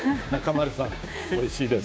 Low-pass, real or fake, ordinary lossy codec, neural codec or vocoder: none; fake; none; codec, 16 kHz, 6 kbps, DAC